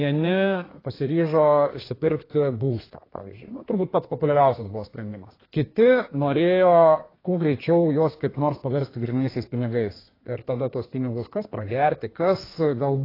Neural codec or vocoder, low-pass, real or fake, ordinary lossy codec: codec, 32 kHz, 1.9 kbps, SNAC; 5.4 kHz; fake; AAC, 24 kbps